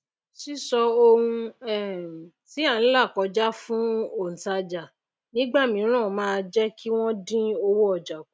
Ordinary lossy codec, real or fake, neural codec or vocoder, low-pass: none; real; none; none